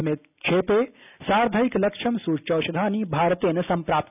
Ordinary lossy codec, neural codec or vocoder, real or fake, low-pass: none; none; real; 3.6 kHz